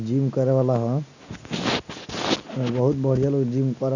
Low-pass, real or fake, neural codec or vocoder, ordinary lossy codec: 7.2 kHz; real; none; none